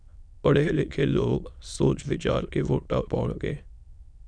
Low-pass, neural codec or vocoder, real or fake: 9.9 kHz; autoencoder, 22.05 kHz, a latent of 192 numbers a frame, VITS, trained on many speakers; fake